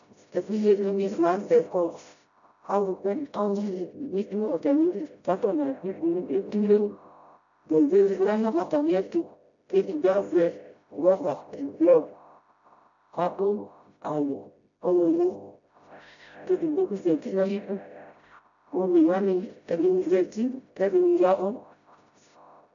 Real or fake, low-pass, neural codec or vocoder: fake; 7.2 kHz; codec, 16 kHz, 0.5 kbps, FreqCodec, smaller model